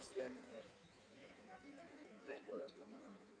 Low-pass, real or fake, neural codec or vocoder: 9.9 kHz; fake; codec, 16 kHz in and 24 kHz out, 1.1 kbps, FireRedTTS-2 codec